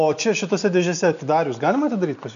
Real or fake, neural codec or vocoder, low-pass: real; none; 7.2 kHz